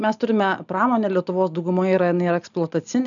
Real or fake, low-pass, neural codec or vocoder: real; 7.2 kHz; none